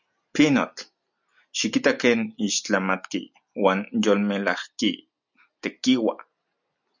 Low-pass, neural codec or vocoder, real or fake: 7.2 kHz; none; real